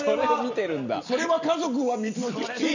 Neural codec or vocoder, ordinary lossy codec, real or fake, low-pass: none; none; real; 7.2 kHz